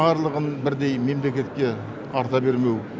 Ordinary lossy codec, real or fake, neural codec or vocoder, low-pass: none; real; none; none